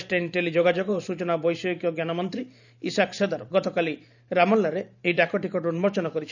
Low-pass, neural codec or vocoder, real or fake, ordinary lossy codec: 7.2 kHz; vocoder, 44.1 kHz, 128 mel bands every 512 samples, BigVGAN v2; fake; none